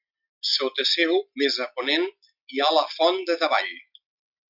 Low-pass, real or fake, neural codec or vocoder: 5.4 kHz; real; none